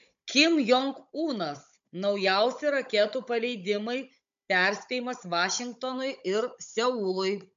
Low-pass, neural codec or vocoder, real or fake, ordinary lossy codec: 7.2 kHz; codec, 16 kHz, 16 kbps, FunCodec, trained on Chinese and English, 50 frames a second; fake; MP3, 64 kbps